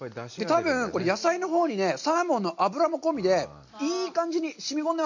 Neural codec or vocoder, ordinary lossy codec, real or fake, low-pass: none; none; real; 7.2 kHz